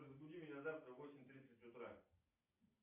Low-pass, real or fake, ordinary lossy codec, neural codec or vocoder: 3.6 kHz; real; MP3, 32 kbps; none